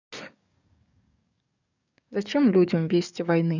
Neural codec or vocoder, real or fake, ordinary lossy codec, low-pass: codec, 44.1 kHz, 7.8 kbps, DAC; fake; none; 7.2 kHz